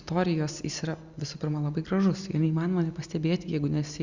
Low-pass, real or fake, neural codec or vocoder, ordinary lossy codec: 7.2 kHz; real; none; Opus, 64 kbps